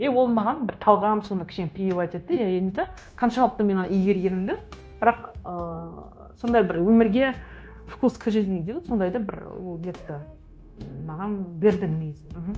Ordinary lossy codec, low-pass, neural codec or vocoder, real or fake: none; none; codec, 16 kHz, 0.9 kbps, LongCat-Audio-Codec; fake